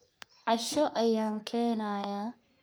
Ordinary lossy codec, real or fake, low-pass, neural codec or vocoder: none; fake; none; codec, 44.1 kHz, 3.4 kbps, Pupu-Codec